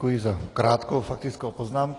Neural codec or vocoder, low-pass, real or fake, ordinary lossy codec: none; 10.8 kHz; real; AAC, 32 kbps